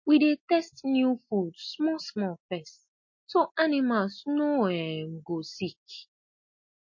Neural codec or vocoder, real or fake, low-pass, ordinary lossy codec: none; real; 7.2 kHz; MP3, 32 kbps